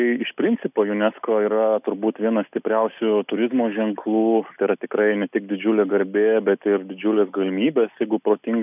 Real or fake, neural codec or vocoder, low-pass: real; none; 3.6 kHz